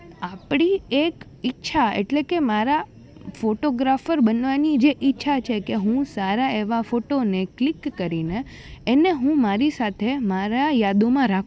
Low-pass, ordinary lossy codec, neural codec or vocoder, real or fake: none; none; none; real